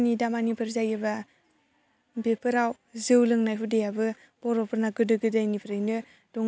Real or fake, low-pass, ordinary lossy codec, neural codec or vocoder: real; none; none; none